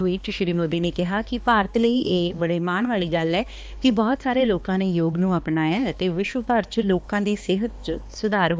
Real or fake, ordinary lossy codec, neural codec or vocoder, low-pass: fake; none; codec, 16 kHz, 2 kbps, X-Codec, HuBERT features, trained on balanced general audio; none